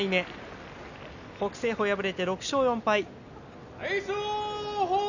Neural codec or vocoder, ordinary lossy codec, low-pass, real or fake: none; none; 7.2 kHz; real